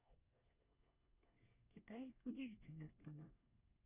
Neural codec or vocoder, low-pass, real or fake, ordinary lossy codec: codec, 24 kHz, 1 kbps, SNAC; 3.6 kHz; fake; none